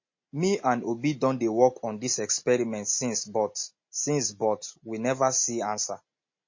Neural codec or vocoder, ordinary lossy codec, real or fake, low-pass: none; MP3, 32 kbps; real; 7.2 kHz